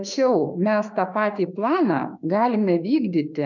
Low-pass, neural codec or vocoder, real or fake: 7.2 kHz; autoencoder, 48 kHz, 32 numbers a frame, DAC-VAE, trained on Japanese speech; fake